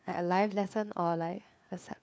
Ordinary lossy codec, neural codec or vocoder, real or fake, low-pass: none; codec, 16 kHz, 4 kbps, FunCodec, trained on LibriTTS, 50 frames a second; fake; none